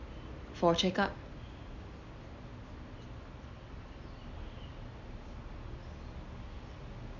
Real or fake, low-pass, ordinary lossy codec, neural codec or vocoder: real; 7.2 kHz; none; none